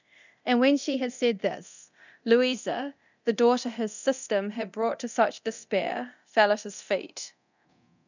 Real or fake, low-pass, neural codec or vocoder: fake; 7.2 kHz; codec, 24 kHz, 0.9 kbps, DualCodec